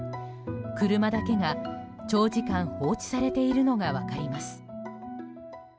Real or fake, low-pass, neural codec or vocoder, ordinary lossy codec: real; none; none; none